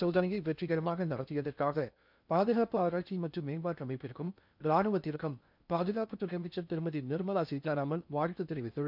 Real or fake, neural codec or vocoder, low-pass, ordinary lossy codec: fake; codec, 16 kHz in and 24 kHz out, 0.6 kbps, FocalCodec, streaming, 2048 codes; 5.4 kHz; none